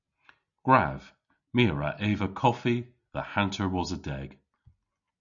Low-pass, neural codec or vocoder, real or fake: 7.2 kHz; none; real